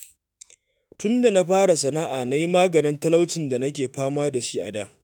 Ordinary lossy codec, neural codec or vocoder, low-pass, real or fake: none; autoencoder, 48 kHz, 32 numbers a frame, DAC-VAE, trained on Japanese speech; none; fake